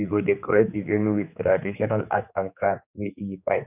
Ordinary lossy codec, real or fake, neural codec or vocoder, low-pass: none; fake; codec, 16 kHz, 4 kbps, X-Codec, HuBERT features, trained on general audio; 3.6 kHz